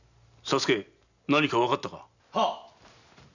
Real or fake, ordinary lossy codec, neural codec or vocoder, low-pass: real; none; none; 7.2 kHz